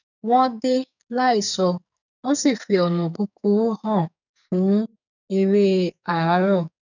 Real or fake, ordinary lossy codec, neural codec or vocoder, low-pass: fake; none; codec, 44.1 kHz, 2.6 kbps, SNAC; 7.2 kHz